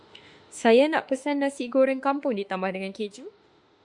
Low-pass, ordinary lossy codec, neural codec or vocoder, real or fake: 10.8 kHz; Opus, 64 kbps; autoencoder, 48 kHz, 32 numbers a frame, DAC-VAE, trained on Japanese speech; fake